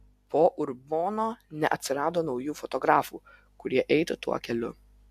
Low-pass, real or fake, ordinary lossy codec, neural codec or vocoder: 14.4 kHz; real; AAC, 96 kbps; none